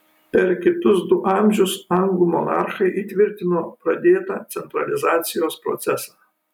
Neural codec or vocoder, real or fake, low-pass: none; real; 19.8 kHz